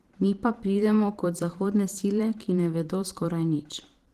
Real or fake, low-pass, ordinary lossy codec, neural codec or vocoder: fake; 14.4 kHz; Opus, 16 kbps; vocoder, 44.1 kHz, 128 mel bands every 512 samples, BigVGAN v2